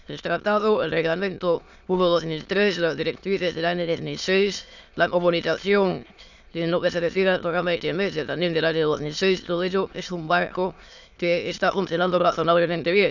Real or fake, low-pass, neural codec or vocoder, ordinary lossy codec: fake; 7.2 kHz; autoencoder, 22.05 kHz, a latent of 192 numbers a frame, VITS, trained on many speakers; none